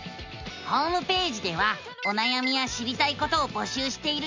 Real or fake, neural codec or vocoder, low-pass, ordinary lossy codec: real; none; 7.2 kHz; MP3, 64 kbps